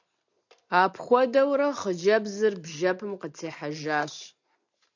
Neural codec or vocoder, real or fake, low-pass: none; real; 7.2 kHz